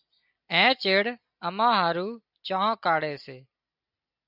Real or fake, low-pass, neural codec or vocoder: real; 5.4 kHz; none